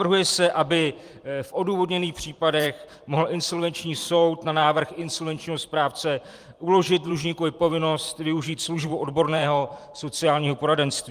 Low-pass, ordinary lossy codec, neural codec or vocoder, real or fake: 14.4 kHz; Opus, 32 kbps; vocoder, 44.1 kHz, 128 mel bands every 512 samples, BigVGAN v2; fake